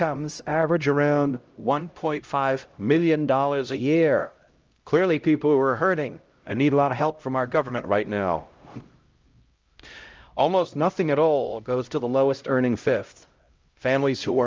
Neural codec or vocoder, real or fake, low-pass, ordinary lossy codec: codec, 16 kHz, 0.5 kbps, X-Codec, HuBERT features, trained on LibriSpeech; fake; 7.2 kHz; Opus, 24 kbps